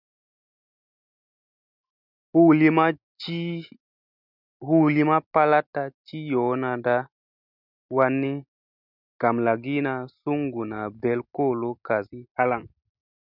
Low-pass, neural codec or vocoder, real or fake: 5.4 kHz; none; real